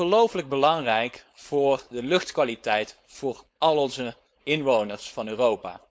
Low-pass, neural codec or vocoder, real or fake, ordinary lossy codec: none; codec, 16 kHz, 4.8 kbps, FACodec; fake; none